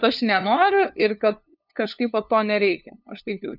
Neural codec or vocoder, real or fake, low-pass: codec, 16 kHz, 2 kbps, FunCodec, trained on LibriTTS, 25 frames a second; fake; 5.4 kHz